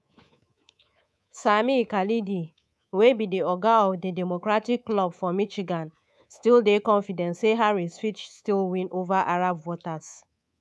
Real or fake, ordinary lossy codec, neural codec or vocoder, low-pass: fake; none; codec, 24 kHz, 3.1 kbps, DualCodec; none